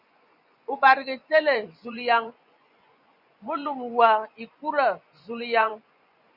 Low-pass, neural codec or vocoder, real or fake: 5.4 kHz; vocoder, 24 kHz, 100 mel bands, Vocos; fake